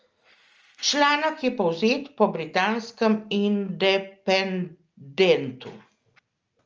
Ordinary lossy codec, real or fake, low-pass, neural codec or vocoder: Opus, 24 kbps; real; 7.2 kHz; none